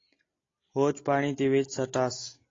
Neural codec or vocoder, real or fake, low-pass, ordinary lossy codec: none; real; 7.2 kHz; AAC, 32 kbps